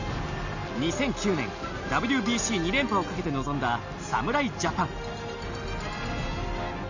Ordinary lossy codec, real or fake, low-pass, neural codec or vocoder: none; real; 7.2 kHz; none